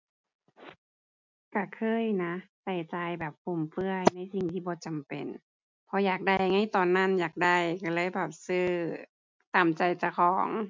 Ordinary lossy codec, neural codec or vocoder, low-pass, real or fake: MP3, 64 kbps; none; 7.2 kHz; real